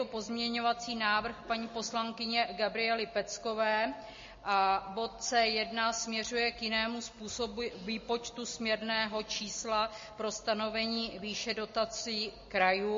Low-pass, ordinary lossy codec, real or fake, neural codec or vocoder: 7.2 kHz; MP3, 32 kbps; real; none